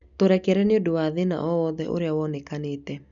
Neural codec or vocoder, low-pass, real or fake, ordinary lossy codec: none; 7.2 kHz; real; none